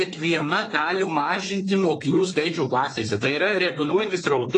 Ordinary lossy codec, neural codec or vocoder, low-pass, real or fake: AAC, 32 kbps; codec, 24 kHz, 1 kbps, SNAC; 10.8 kHz; fake